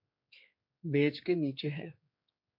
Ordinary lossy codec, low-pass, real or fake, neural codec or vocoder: MP3, 32 kbps; 5.4 kHz; fake; codec, 16 kHz, 4 kbps, X-Codec, HuBERT features, trained on general audio